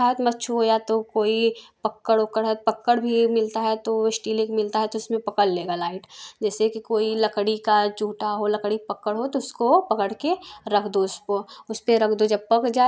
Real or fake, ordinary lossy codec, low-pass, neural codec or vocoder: real; none; none; none